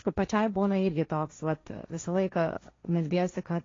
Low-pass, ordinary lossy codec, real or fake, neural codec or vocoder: 7.2 kHz; AAC, 32 kbps; fake; codec, 16 kHz, 1.1 kbps, Voila-Tokenizer